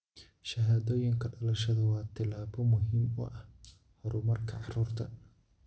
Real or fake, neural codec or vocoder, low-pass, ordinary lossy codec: real; none; none; none